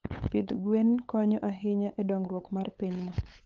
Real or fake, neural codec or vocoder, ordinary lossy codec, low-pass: fake; codec, 16 kHz, 8 kbps, FunCodec, trained on LibriTTS, 25 frames a second; Opus, 32 kbps; 7.2 kHz